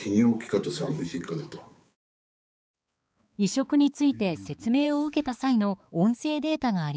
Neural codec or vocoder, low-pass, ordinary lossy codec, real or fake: codec, 16 kHz, 4 kbps, X-Codec, HuBERT features, trained on balanced general audio; none; none; fake